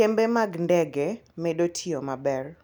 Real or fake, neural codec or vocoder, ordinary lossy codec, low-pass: real; none; none; 19.8 kHz